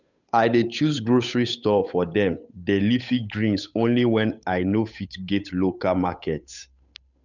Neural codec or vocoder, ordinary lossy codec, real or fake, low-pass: codec, 16 kHz, 8 kbps, FunCodec, trained on Chinese and English, 25 frames a second; none; fake; 7.2 kHz